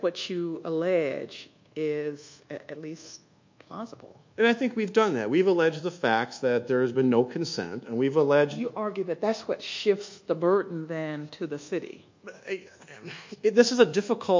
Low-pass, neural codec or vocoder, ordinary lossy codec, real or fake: 7.2 kHz; codec, 24 kHz, 1.2 kbps, DualCodec; MP3, 48 kbps; fake